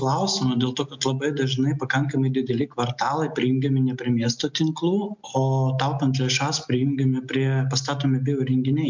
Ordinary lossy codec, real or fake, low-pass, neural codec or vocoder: MP3, 64 kbps; real; 7.2 kHz; none